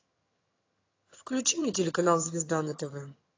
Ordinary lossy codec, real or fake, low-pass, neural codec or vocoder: AAC, 32 kbps; fake; 7.2 kHz; vocoder, 22.05 kHz, 80 mel bands, HiFi-GAN